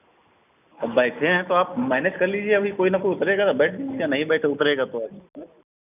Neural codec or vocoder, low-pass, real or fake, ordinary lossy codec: none; 3.6 kHz; real; none